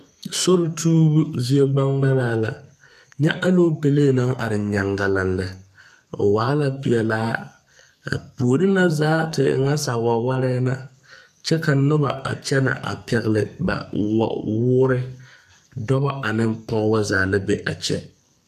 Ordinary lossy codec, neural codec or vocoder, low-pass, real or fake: AAC, 96 kbps; codec, 44.1 kHz, 2.6 kbps, SNAC; 14.4 kHz; fake